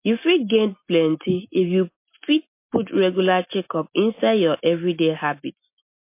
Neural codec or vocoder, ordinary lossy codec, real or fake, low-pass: none; MP3, 24 kbps; real; 3.6 kHz